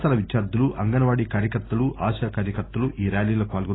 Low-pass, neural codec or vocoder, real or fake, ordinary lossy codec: 7.2 kHz; none; real; AAC, 16 kbps